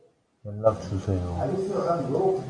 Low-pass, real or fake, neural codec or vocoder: 9.9 kHz; real; none